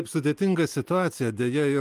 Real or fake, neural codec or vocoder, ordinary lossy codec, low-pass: real; none; Opus, 32 kbps; 14.4 kHz